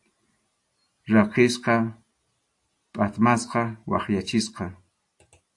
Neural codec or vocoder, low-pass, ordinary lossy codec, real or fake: none; 10.8 kHz; AAC, 64 kbps; real